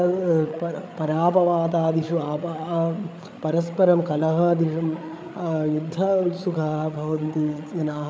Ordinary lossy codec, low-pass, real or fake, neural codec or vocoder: none; none; fake; codec, 16 kHz, 8 kbps, FreqCodec, larger model